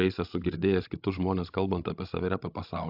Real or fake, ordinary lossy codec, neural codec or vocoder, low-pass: fake; AAC, 48 kbps; codec, 16 kHz, 16 kbps, FreqCodec, larger model; 5.4 kHz